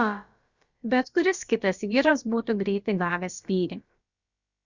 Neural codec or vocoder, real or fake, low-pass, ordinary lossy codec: codec, 16 kHz, about 1 kbps, DyCAST, with the encoder's durations; fake; 7.2 kHz; Opus, 64 kbps